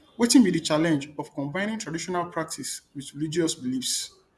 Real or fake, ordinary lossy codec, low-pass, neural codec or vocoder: fake; none; none; vocoder, 24 kHz, 100 mel bands, Vocos